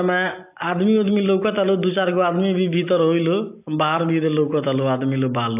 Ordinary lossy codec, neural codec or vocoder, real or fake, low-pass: AAC, 32 kbps; none; real; 3.6 kHz